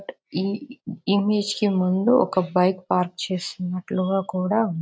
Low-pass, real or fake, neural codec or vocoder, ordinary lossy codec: none; real; none; none